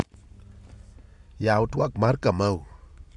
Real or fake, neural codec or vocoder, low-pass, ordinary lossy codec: real; none; 10.8 kHz; none